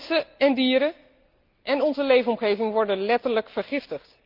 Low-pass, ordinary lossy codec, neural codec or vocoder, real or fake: 5.4 kHz; Opus, 24 kbps; none; real